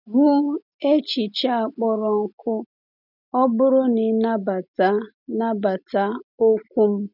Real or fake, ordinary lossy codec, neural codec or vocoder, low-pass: real; none; none; 5.4 kHz